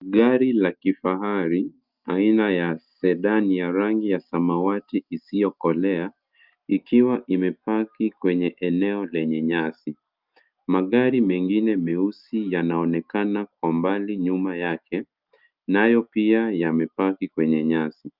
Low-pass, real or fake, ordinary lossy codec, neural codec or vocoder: 5.4 kHz; real; Opus, 24 kbps; none